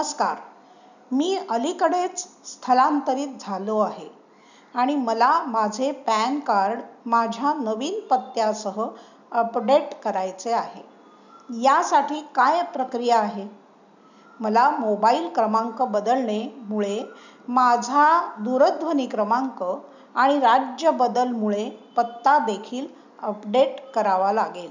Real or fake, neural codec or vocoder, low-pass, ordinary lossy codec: real; none; 7.2 kHz; none